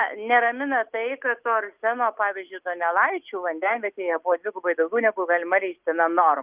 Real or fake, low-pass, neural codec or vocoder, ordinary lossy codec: real; 3.6 kHz; none; Opus, 24 kbps